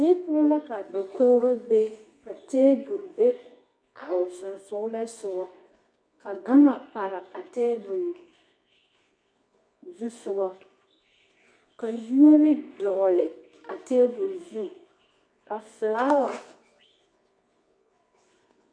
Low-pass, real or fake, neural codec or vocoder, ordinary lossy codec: 9.9 kHz; fake; codec, 24 kHz, 0.9 kbps, WavTokenizer, medium music audio release; MP3, 64 kbps